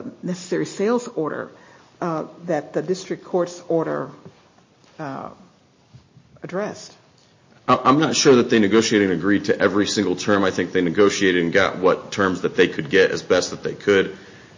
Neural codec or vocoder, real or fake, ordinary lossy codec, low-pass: none; real; MP3, 32 kbps; 7.2 kHz